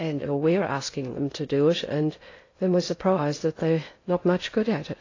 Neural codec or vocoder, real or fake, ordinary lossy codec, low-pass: codec, 16 kHz in and 24 kHz out, 0.6 kbps, FocalCodec, streaming, 2048 codes; fake; AAC, 32 kbps; 7.2 kHz